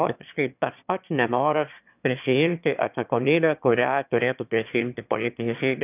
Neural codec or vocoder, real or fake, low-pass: autoencoder, 22.05 kHz, a latent of 192 numbers a frame, VITS, trained on one speaker; fake; 3.6 kHz